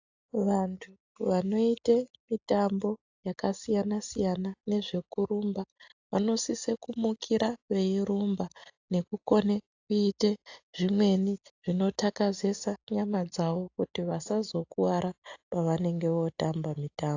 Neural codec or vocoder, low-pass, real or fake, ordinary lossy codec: none; 7.2 kHz; real; AAC, 48 kbps